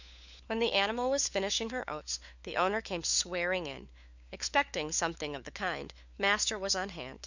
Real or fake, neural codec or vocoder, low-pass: fake; codec, 16 kHz, 4 kbps, FunCodec, trained on LibriTTS, 50 frames a second; 7.2 kHz